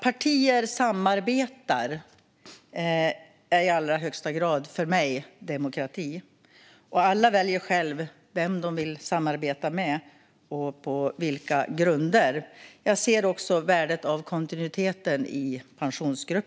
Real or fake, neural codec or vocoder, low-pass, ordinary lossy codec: real; none; none; none